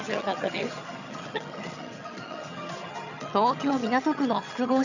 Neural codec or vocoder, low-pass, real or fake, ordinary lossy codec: vocoder, 22.05 kHz, 80 mel bands, HiFi-GAN; 7.2 kHz; fake; none